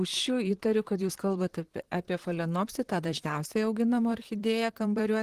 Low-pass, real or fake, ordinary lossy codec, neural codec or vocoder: 14.4 kHz; fake; Opus, 16 kbps; vocoder, 44.1 kHz, 128 mel bands, Pupu-Vocoder